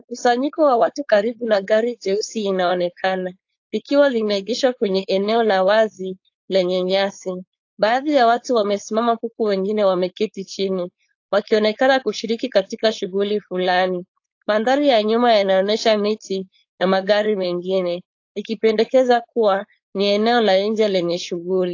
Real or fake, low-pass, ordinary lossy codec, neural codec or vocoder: fake; 7.2 kHz; AAC, 48 kbps; codec, 16 kHz, 4.8 kbps, FACodec